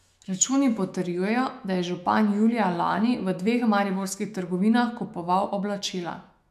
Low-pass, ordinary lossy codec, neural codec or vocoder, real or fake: 14.4 kHz; none; autoencoder, 48 kHz, 128 numbers a frame, DAC-VAE, trained on Japanese speech; fake